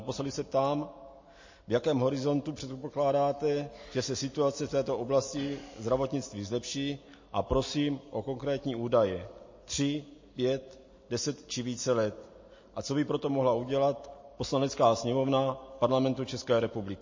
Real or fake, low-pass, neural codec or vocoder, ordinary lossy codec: real; 7.2 kHz; none; MP3, 32 kbps